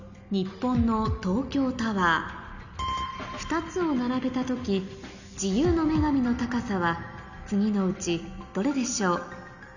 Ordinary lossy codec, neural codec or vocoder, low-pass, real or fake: none; none; 7.2 kHz; real